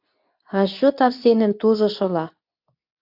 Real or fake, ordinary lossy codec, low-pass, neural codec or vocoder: fake; AAC, 48 kbps; 5.4 kHz; codec, 24 kHz, 0.9 kbps, WavTokenizer, medium speech release version 2